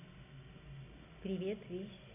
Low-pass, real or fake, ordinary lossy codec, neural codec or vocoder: 3.6 kHz; real; none; none